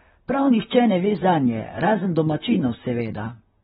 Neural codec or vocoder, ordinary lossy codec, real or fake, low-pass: vocoder, 44.1 kHz, 128 mel bands, Pupu-Vocoder; AAC, 16 kbps; fake; 19.8 kHz